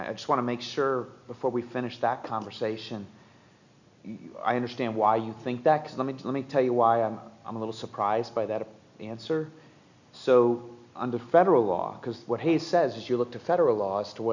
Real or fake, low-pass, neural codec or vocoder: real; 7.2 kHz; none